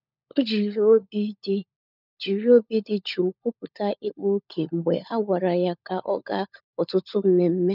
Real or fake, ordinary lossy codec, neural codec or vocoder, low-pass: fake; none; codec, 16 kHz, 16 kbps, FunCodec, trained on LibriTTS, 50 frames a second; 5.4 kHz